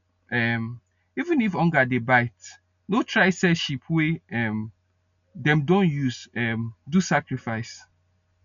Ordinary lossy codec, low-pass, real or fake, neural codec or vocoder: none; 7.2 kHz; real; none